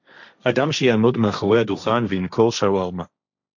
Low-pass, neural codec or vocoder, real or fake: 7.2 kHz; codec, 16 kHz, 1.1 kbps, Voila-Tokenizer; fake